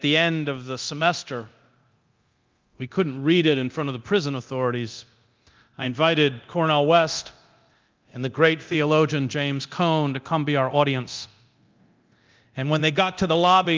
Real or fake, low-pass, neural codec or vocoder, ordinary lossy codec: fake; 7.2 kHz; codec, 24 kHz, 0.9 kbps, DualCodec; Opus, 24 kbps